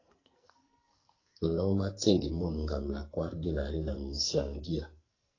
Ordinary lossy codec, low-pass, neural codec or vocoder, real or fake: AAC, 32 kbps; 7.2 kHz; codec, 44.1 kHz, 2.6 kbps, SNAC; fake